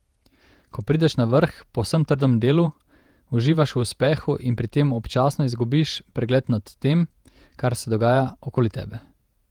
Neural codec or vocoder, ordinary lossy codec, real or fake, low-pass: vocoder, 48 kHz, 128 mel bands, Vocos; Opus, 24 kbps; fake; 19.8 kHz